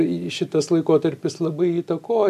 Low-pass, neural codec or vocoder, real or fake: 14.4 kHz; none; real